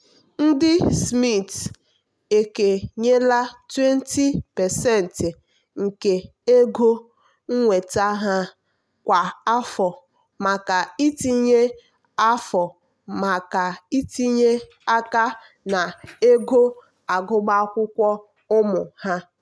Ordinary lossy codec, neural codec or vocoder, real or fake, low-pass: none; none; real; none